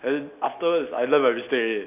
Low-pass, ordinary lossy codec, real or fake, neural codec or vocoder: 3.6 kHz; none; real; none